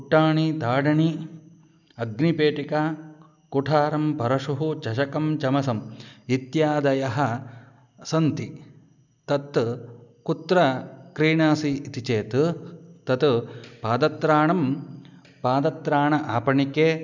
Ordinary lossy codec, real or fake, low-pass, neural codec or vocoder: none; real; 7.2 kHz; none